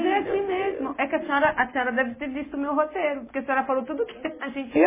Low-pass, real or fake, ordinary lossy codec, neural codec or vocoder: 3.6 kHz; real; MP3, 16 kbps; none